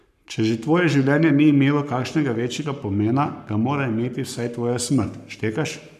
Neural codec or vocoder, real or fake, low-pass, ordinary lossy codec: codec, 44.1 kHz, 7.8 kbps, Pupu-Codec; fake; 14.4 kHz; none